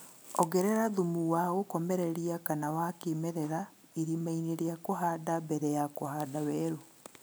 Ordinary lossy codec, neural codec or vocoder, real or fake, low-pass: none; none; real; none